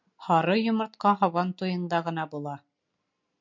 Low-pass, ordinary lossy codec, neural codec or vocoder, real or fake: 7.2 kHz; MP3, 64 kbps; none; real